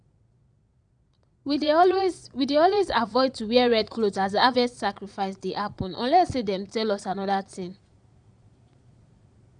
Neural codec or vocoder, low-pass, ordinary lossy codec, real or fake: vocoder, 22.05 kHz, 80 mel bands, Vocos; 9.9 kHz; none; fake